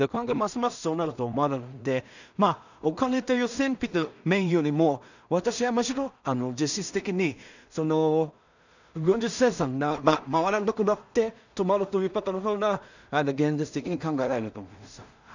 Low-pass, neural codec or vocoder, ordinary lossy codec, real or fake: 7.2 kHz; codec, 16 kHz in and 24 kHz out, 0.4 kbps, LongCat-Audio-Codec, two codebook decoder; none; fake